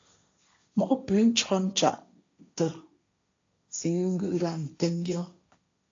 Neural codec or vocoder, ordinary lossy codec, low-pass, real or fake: codec, 16 kHz, 1.1 kbps, Voila-Tokenizer; AAC, 48 kbps; 7.2 kHz; fake